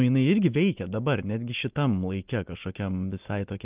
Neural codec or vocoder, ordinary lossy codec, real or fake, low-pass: none; Opus, 24 kbps; real; 3.6 kHz